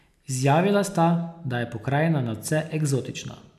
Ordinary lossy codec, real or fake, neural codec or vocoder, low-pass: none; real; none; 14.4 kHz